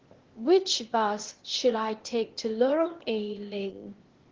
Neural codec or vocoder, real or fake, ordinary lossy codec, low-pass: codec, 16 kHz, 0.8 kbps, ZipCodec; fake; Opus, 16 kbps; 7.2 kHz